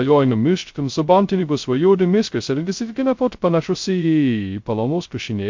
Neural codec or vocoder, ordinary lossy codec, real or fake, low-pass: codec, 16 kHz, 0.2 kbps, FocalCodec; MP3, 48 kbps; fake; 7.2 kHz